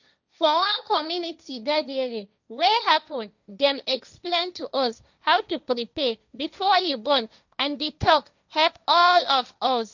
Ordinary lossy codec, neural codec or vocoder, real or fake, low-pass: none; codec, 16 kHz, 1.1 kbps, Voila-Tokenizer; fake; 7.2 kHz